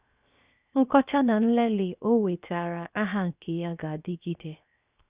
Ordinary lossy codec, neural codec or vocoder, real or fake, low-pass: Opus, 64 kbps; codec, 16 kHz, 0.7 kbps, FocalCodec; fake; 3.6 kHz